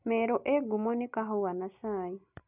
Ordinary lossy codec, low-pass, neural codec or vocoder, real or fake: none; 3.6 kHz; none; real